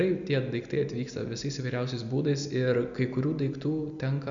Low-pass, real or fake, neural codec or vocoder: 7.2 kHz; real; none